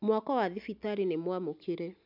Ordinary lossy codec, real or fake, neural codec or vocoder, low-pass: none; real; none; 5.4 kHz